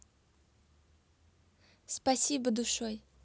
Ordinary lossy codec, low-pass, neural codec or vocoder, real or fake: none; none; none; real